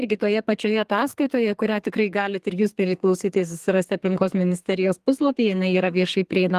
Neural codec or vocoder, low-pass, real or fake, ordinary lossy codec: codec, 32 kHz, 1.9 kbps, SNAC; 14.4 kHz; fake; Opus, 24 kbps